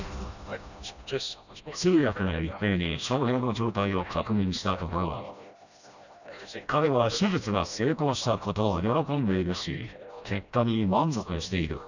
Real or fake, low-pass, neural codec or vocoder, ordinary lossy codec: fake; 7.2 kHz; codec, 16 kHz, 1 kbps, FreqCodec, smaller model; none